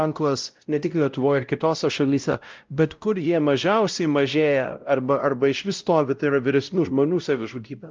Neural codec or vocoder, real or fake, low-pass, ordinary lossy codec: codec, 16 kHz, 0.5 kbps, X-Codec, WavLM features, trained on Multilingual LibriSpeech; fake; 7.2 kHz; Opus, 24 kbps